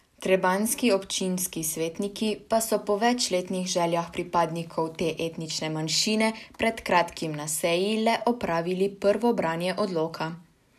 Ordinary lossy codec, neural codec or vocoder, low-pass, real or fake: none; none; 14.4 kHz; real